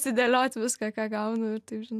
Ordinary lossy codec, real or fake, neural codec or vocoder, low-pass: AAC, 96 kbps; real; none; 14.4 kHz